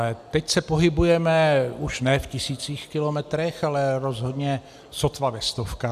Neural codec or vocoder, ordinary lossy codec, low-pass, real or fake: none; AAC, 96 kbps; 14.4 kHz; real